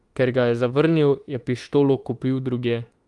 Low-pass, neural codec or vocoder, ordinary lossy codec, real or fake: 10.8 kHz; autoencoder, 48 kHz, 32 numbers a frame, DAC-VAE, trained on Japanese speech; Opus, 24 kbps; fake